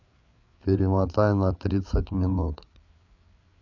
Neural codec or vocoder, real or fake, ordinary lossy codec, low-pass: codec, 16 kHz, 8 kbps, FreqCodec, larger model; fake; none; 7.2 kHz